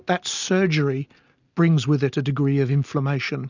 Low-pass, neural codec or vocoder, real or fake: 7.2 kHz; none; real